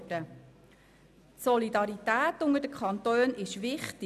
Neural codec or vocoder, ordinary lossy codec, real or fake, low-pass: none; none; real; 14.4 kHz